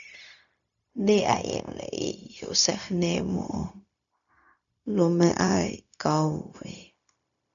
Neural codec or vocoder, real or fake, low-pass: codec, 16 kHz, 0.4 kbps, LongCat-Audio-Codec; fake; 7.2 kHz